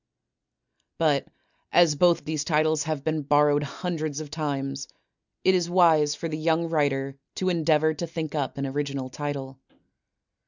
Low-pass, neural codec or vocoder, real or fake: 7.2 kHz; none; real